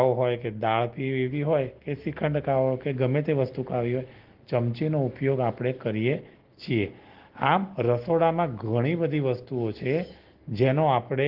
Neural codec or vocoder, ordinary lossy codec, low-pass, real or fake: none; Opus, 16 kbps; 5.4 kHz; real